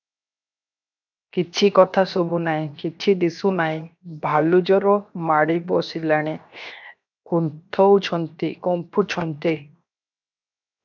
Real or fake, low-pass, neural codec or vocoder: fake; 7.2 kHz; codec, 16 kHz, 0.7 kbps, FocalCodec